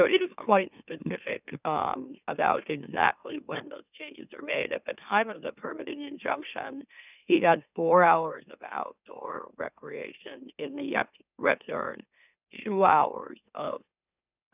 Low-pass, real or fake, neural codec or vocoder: 3.6 kHz; fake; autoencoder, 44.1 kHz, a latent of 192 numbers a frame, MeloTTS